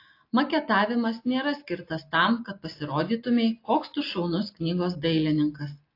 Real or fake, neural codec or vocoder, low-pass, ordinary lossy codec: real; none; 5.4 kHz; AAC, 32 kbps